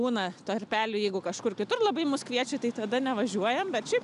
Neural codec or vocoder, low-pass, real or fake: none; 10.8 kHz; real